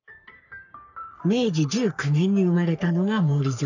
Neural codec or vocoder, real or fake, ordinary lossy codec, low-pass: codec, 44.1 kHz, 3.4 kbps, Pupu-Codec; fake; none; 7.2 kHz